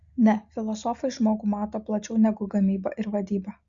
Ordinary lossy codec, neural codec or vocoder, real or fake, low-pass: Opus, 64 kbps; none; real; 7.2 kHz